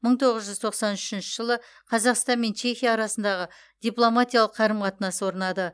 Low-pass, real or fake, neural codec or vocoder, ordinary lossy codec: none; real; none; none